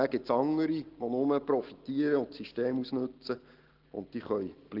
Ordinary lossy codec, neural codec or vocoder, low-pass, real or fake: Opus, 16 kbps; none; 5.4 kHz; real